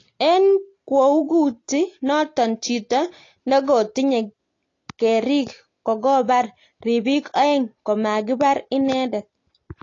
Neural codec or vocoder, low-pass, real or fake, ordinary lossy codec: none; 7.2 kHz; real; AAC, 32 kbps